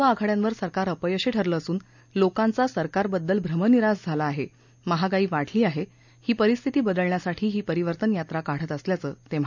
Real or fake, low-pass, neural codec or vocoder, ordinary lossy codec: real; 7.2 kHz; none; none